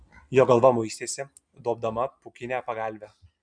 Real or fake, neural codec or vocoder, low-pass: real; none; 9.9 kHz